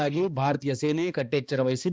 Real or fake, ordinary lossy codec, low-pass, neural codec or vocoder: fake; none; none; codec, 16 kHz, 2 kbps, X-Codec, HuBERT features, trained on balanced general audio